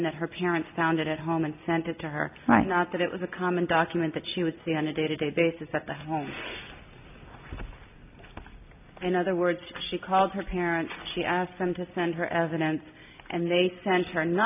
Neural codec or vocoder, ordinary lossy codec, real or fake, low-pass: none; AAC, 32 kbps; real; 3.6 kHz